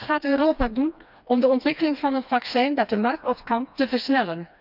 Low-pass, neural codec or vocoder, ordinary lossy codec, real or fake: 5.4 kHz; codec, 16 kHz, 2 kbps, FreqCodec, smaller model; none; fake